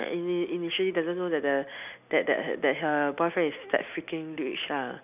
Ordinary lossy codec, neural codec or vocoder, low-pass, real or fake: none; none; 3.6 kHz; real